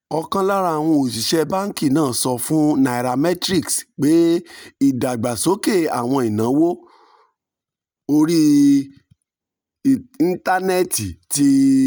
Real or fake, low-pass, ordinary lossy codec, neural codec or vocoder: real; none; none; none